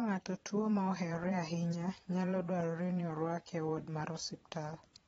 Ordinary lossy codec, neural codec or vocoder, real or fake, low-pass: AAC, 24 kbps; vocoder, 22.05 kHz, 80 mel bands, Vocos; fake; 9.9 kHz